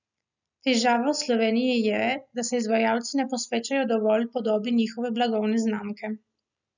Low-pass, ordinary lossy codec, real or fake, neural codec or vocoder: 7.2 kHz; none; real; none